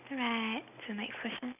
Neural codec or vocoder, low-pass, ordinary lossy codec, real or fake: none; 3.6 kHz; none; real